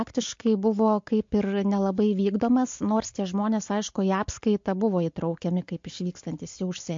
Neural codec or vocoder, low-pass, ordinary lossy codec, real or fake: none; 7.2 kHz; MP3, 48 kbps; real